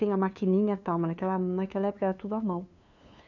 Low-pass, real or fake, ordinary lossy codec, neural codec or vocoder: 7.2 kHz; fake; none; codec, 16 kHz, 2 kbps, FunCodec, trained on LibriTTS, 25 frames a second